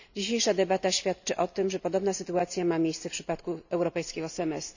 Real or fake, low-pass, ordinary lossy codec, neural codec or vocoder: real; 7.2 kHz; none; none